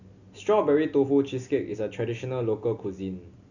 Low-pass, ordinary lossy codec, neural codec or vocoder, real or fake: 7.2 kHz; none; none; real